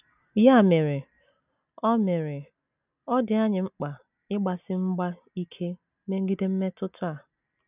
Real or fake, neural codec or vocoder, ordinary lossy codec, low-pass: real; none; none; 3.6 kHz